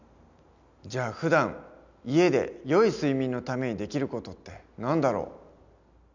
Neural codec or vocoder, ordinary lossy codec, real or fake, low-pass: none; none; real; 7.2 kHz